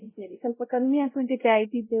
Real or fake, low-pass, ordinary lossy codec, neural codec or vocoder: fake; 3.6 kHz; MP3, 16 kbps; codec, 16 kHz, 0.5 kbps, X-Codec, WavLM features, trained on Multilingual LibriSpeech